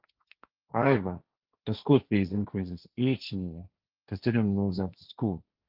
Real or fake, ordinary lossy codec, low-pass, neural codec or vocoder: fake; Opus, 24 kbps; 5.4 kHz; codec, 16 kHz, 1.1 kbps, Voila-Tokenizer